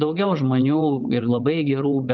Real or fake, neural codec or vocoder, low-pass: fake; vocoder, 22.05 kHz, 80 mel bands, WaveNeXt; 7.2 kHz